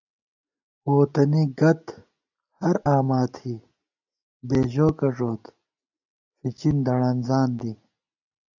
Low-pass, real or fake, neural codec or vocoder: 7.2 kHz; real; none